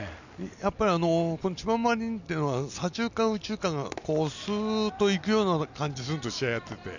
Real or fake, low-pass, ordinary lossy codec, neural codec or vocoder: real; 7.2 kHz; none; none